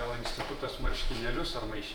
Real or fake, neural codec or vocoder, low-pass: fake; vocoder, 44.1 kHz, 128 mel bands every 512 samples, BigVGAN v2; 19.8 kHz